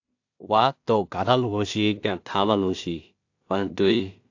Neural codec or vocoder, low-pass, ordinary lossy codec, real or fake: codec, 16 kHz in and 24 kHz out, 0.4 kbps, LongCat-Audio-Codec, two codebook decoder; 7.2 kHz; AAC, 48 kbps; fake